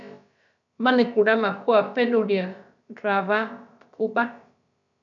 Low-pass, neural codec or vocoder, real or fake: 7.2 kHz; codec, 16 kHz, about 1 kbps, DyCAST, with the encoder's durations; fake